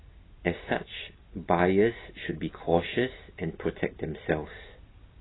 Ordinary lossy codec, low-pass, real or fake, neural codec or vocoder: AAC, 16 kbps; 7.2 kHz; real; none